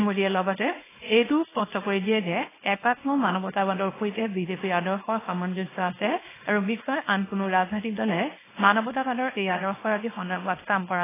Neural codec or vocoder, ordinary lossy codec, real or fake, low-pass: codec, 24 kHz, 0.9 kbps, WavTokenizer, small release; AAC, 16 kbps; fake; 3.6 kHz